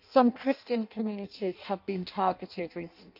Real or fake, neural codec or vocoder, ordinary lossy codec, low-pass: fake; codec, 16 kHz in and 24 kHz out, 0.6 kbps, FireRedTTS-2 codec; none; 5.4 kHz